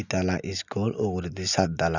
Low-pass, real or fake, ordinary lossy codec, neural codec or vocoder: 7.2 kHz; real; none; none